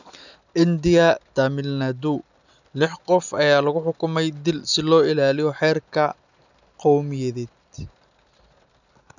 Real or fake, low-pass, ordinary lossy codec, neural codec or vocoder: real; 7.2 kHz; none; none